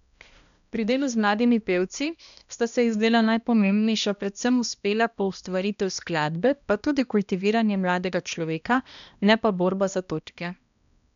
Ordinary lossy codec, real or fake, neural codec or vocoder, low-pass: none; fake; codec, 16 kHz, 1 kbps, X-Codec, HuBERT features, trained on balanced general audio; 7.2 kHz